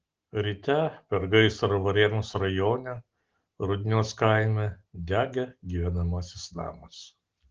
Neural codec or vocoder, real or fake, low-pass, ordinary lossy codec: none; real; 7.2 kHz; Opus, 16 kbps